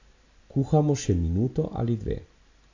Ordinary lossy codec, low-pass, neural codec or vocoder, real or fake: AAC, 48 kbps; 7.2 kHz; none; real